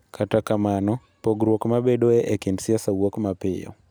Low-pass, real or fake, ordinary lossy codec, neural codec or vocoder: none; real; none; none